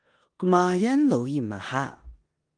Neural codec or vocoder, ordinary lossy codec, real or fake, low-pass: codec, 16 kHz in and 24 kHz out, 0.9 kbps, LongCat-Audio-Codec, four codebook decoder; Opus, 24 kbps; fake; 9.9 kHz